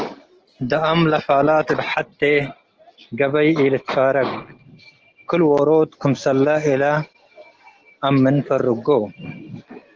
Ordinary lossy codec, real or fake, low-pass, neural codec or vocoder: Opus, 16 kbps; real; 7.2 kHz; none